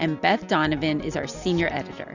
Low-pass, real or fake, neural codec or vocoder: 7.2 kHz; real; none